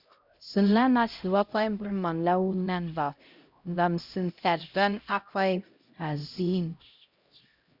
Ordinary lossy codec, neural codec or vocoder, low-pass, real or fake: Opus, 64 kbps; codec, 16 kHz, 0.5 kbps, X-Codec, HuBERT features, trained on LibriSpeech; 5.4 kHz; fake